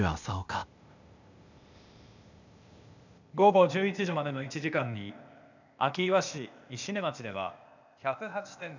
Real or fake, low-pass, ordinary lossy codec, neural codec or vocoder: fake; 7.2 kHz; none; codec, 16 kHz, 0.8 kbps, ZipCodec